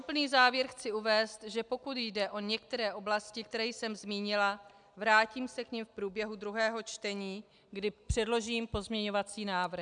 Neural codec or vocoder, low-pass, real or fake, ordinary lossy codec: none; 9.9 kHz; real; MP3, 96 kbps